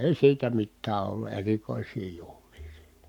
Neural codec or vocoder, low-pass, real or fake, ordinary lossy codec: codec, 44.1 kHz, 7.8 kbps, Pupu-Codec; 19.8 kHz; fake; none